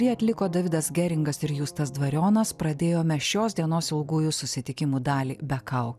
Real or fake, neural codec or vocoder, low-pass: real; none; 14.4 kHz